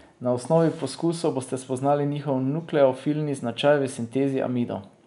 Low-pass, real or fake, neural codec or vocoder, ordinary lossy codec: 10.8 kHz; real; none; none